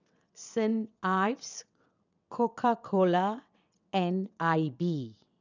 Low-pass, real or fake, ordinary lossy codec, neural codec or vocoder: 7.2 kHz; fake; none; vocoder, 22.05 kHz, 80 mel bands, WaveNeXt